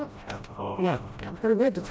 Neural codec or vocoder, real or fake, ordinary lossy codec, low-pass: codec, 16 kHz, 0.5 kbps, FreqCodec, smaller model; fake; none; none